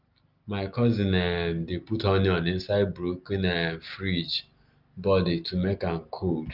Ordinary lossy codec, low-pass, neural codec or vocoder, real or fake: Opus, 32 kbps; 5.4 kHz; none; real